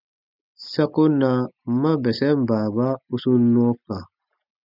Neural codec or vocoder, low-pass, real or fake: none; 5.4 kHz; real